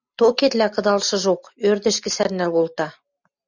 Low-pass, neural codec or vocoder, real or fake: 7.2 kHz; none; real